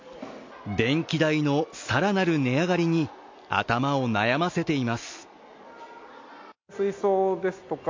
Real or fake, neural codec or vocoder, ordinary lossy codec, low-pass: real; none; MP3, 48 kbps; 7.2 kHz